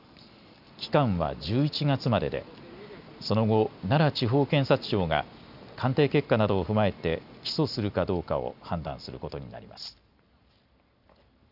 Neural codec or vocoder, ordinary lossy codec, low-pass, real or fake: none; none; 5.4 kHz; real